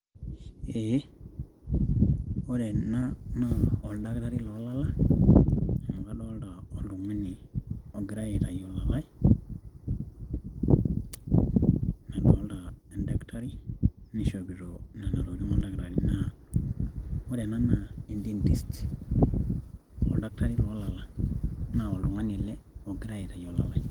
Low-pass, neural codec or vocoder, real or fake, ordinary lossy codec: 19.8 kHz; none; real; Opus, 24 kbps